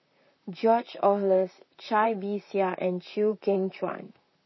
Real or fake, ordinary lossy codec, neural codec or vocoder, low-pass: fake; MP3, 24 kbps; vocoder, 44.1 kHz, 128 mel bands, Pupu-Vocoder; 7.2 kHz